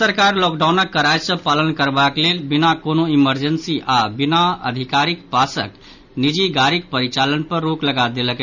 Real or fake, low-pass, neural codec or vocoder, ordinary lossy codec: real; 7.2 kHz; none; none